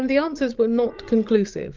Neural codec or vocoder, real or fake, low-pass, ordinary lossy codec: codec, 16 kHz, 16 kbps, FreqCodec, larger model; fake; 7.2 kHz; Opus, 32 kbps